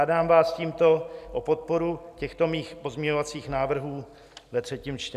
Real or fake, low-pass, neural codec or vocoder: real; 14.4 kHz; none